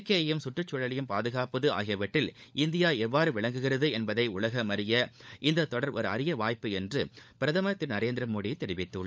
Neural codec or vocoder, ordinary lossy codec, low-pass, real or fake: codec, 16 kHz, 16 kbps, FunCodec, trained on LibriTTS, 50 frames a second; none; none; fake